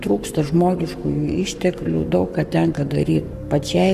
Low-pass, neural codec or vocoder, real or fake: 14.4 kHz; codec, 44.1 kHz, 7.8 kbps, Pupu-Codec; fake